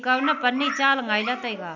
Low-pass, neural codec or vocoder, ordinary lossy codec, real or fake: 7.2 kHz; none; none; real